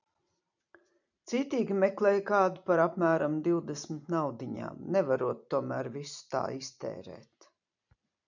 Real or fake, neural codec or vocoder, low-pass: fake; vocoder, 44.1 kHz, 128 mel bands every 256 samples, BigVGAN v2; 7.2 kHz